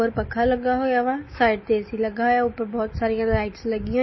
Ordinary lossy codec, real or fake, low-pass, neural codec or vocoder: MP3, 24 kbps; real; 7.2 kHz; none